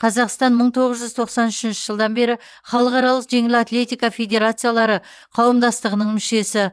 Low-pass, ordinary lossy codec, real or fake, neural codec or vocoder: none; none; fake; vocoder, 22.05 kHz, 80 mel bands, WaveNeXt